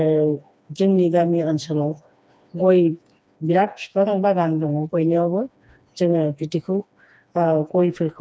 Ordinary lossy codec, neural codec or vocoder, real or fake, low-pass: none; codec, 16 kHz, 2 kbps, FreqCodec, smaller model; fake; none